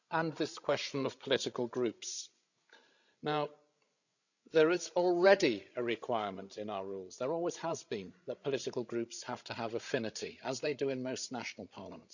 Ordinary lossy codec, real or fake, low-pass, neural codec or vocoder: none; fake; 7.2 kHz; codec, 16 kHz, 16 kbps, FreqCodec, larger model